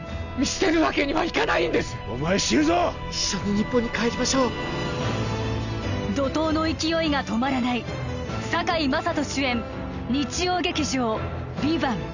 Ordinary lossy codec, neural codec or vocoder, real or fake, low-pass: none; none; real; 7.2 kHz